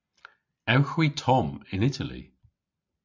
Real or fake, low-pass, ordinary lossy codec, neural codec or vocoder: real; 7.2 kHz; MP3, 64 kbps; none